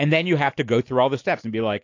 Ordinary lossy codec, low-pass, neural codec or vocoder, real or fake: AAC, 48 kbps; 7.2 kHz; none; real